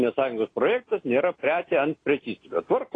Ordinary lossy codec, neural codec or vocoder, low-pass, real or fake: AAC, 32 kbps; none; 9.9 kHz; real